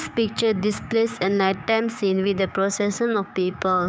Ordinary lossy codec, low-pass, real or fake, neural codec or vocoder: none; none; fake; codec, 16 kHz, 6 kbps, DAC